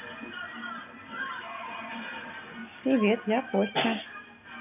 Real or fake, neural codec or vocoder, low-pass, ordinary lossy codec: real; none; 3.6 kHz; none